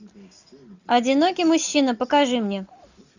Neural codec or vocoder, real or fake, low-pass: none; real; 7.2 kHz